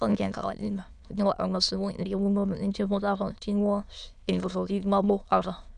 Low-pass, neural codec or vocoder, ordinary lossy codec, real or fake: 9.9 kHz; autoencoder, 22.05 kHz, a latent of 192 numbers a frame, VITS, trained on many speakers; none; fake